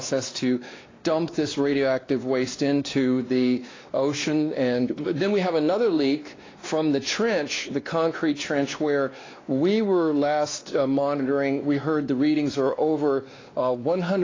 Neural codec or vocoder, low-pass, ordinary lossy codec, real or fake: codec, 16 kHz, 2 kbps, X-Codec, WavLM features, trained on Multilingual LibriSpeech; 7.2 kHz; AAC, 32 kbps; fake